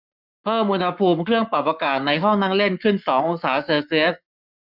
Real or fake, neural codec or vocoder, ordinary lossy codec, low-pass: fake; codec, 44.1 kHz, 7.8 kbps, Pupu-Codec; none; 5.4 kHz